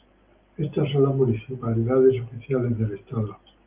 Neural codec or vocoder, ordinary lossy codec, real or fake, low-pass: none; Opus, 32 kbps; real; 3.6 kHz